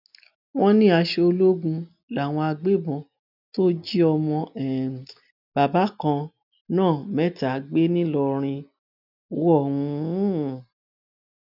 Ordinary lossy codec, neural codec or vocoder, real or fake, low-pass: none; none; real; 5.4 kHz